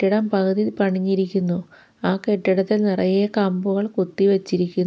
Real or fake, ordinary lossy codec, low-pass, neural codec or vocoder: real; none; none; none